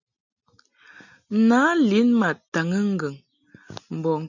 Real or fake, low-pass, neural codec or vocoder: real; 7.2 kHz; none